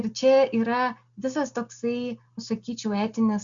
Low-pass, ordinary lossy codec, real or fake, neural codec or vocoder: 7.2 kHz; Opus, 64 kbps; real; none